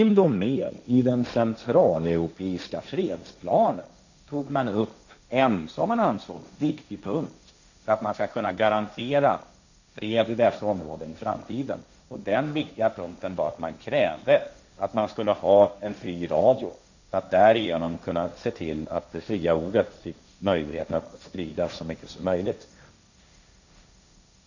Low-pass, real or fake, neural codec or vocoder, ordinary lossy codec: 7.2 kHz; fake; codec, 16 kHz, 1.1 kbps, Voila-Tokenizer; none